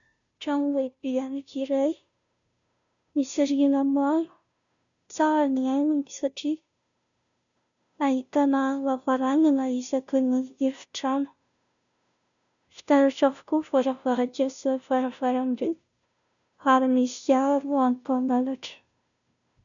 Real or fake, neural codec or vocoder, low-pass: fake; codec, 16 kHz, 0.5 kbps, FunCodec, trained on Chinese and English, 25 frames a second; 7.2 kHz